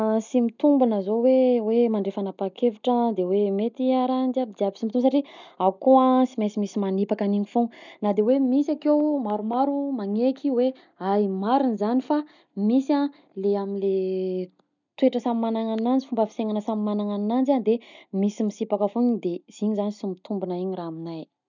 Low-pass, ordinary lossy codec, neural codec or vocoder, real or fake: 7.2 kHz; none; none; real